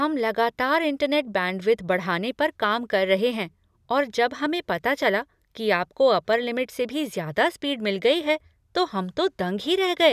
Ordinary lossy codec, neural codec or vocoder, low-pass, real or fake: none; none; 14.4 kHz; real